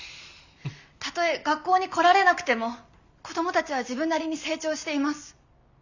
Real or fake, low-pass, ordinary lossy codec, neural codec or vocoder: real; 7.2 kHz; none; none